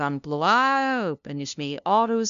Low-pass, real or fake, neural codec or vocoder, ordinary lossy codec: 7.2 kHz; fake; codec, 16 kHz, 0.5 kbps, FunCodec, trained on LibriTTS, 25 frames a second; MP3, 96 kbps